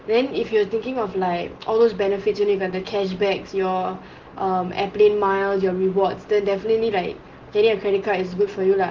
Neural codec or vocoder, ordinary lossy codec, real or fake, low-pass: none; Opus, 16 kbps; real; 7.2 kHz